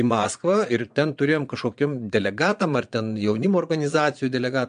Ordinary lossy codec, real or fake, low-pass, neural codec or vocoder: MP3, 64 kbps; fake; 9.9 kHz; vocoder, 22.05 kHz, 80 mel bands, Vocos